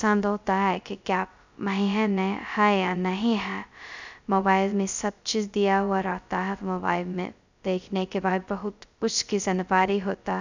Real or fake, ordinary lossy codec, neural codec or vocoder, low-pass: fake; none; codec, 16 kHz, 0.2 kbps, FocalCodec; 7.2 kHz